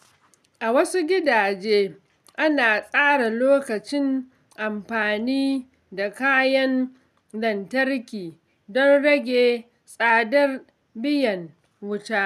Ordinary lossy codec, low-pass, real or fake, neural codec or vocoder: none; 14.4 kHz; real; none